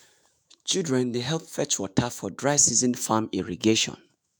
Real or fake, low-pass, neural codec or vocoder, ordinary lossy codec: fake; none; autoencoder, 48 kHz, 128 numbers a frame, DAC-VAE, trained on Japanese speech; none